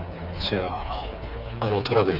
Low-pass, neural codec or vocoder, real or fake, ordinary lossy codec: 5.4 kHz; codec, 16 kHz, 4 kbps, FreqCodec, smaller model; fake; none